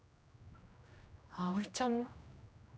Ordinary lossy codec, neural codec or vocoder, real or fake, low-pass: none; codec, 16 kHz, 1 kbps, X-Codec, HuBERT features, trained on general audio; fake; none